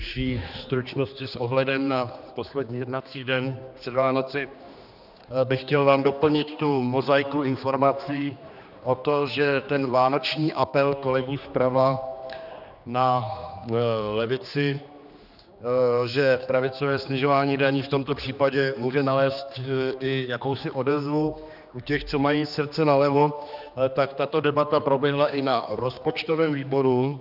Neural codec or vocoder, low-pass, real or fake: codec, 16 kHz, 2 kbps, X-Codec, HuBERT features, trained on general audio; 5.4 kHz; fake